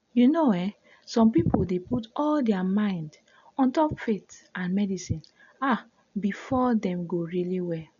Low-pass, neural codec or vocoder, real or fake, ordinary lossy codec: 7.2 kHz; none; real; none